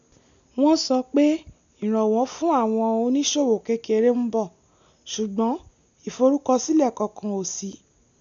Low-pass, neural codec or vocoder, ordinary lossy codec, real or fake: 7.2 kHz; none; none; real